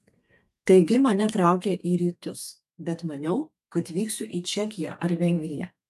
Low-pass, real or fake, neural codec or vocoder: 14.4 kHz; fake; codec, 32 kHz, 1.9 kbps, SNAC